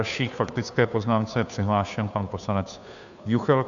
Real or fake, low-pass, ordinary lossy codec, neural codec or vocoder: fake; 7.2 kHz; MP3, 96 kbps; codec, 16 kHz, 2 kbps, FunCodec, trained on Chinese and English, 25 frames a second